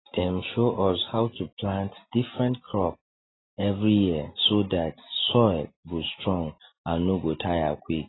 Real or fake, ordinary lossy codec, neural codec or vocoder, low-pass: real; AAC, 16 kbps; none; 7.2 kHz